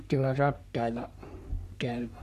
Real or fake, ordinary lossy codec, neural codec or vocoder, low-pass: fake; none; codec, 44.1 kHz, 3.4 kbps, Pupu-Codec; 14.4 kHz